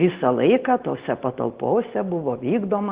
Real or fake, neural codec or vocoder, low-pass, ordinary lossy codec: real; none; 3.6 kHz; Opus, 16 kbps